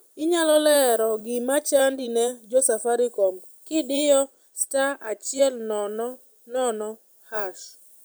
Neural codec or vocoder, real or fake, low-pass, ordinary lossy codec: vocoder, 44.1 kHz, 128 mel bands every 512 samples, BigVGAN v2; fake; none; none